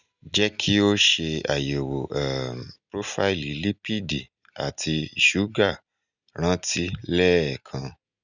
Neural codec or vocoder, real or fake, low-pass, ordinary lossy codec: none; real; 7.2 kHz; none